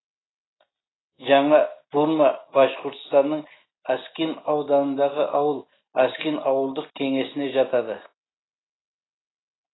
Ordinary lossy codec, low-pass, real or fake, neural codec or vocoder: AAC, 16 kbps; 7.2 kHz; real; none